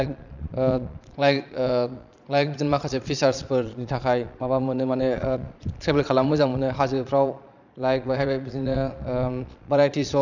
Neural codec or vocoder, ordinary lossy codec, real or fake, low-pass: vocoder, 22.05 kHz, 80 mel bands, Vocos; none; fake; 7.2 kHz